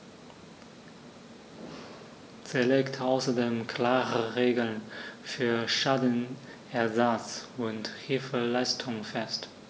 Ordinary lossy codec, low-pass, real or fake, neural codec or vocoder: none; none; real; none